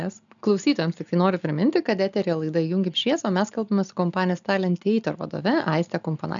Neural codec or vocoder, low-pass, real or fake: none; 7.2 kHz; real